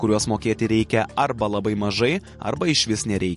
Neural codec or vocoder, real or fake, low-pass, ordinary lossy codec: none; real; 14.4 kHz; MP3, 48 kbps